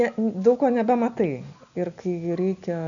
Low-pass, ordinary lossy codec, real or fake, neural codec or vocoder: 7.2 kHz; MP3, 96 kbps; real; none